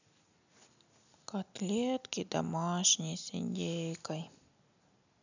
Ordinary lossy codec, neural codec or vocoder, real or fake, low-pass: none; none; real; 7.2 kHz